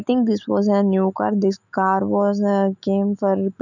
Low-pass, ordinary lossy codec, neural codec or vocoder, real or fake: 7.2 kHz; none; autoencoder, 48 kHz, 128 numbers a frame, DAC-VAE, trained on Japanese speech; fake